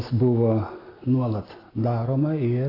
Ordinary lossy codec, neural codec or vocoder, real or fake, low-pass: AAC, 24 kbps; none; real; 5.4 kHz